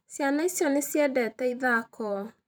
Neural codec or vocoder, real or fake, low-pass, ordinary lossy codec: vocoder, 44.1 kHz, 128 mel bands every 512 samples, BigVGAN v2; fake; none; none